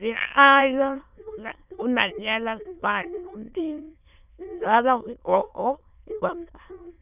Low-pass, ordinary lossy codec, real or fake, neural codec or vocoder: 3.6 kHz; none; fake; autoencoder, 22.05 kHz, a latent of 192 numbers a frame, VITS, trained on many speakers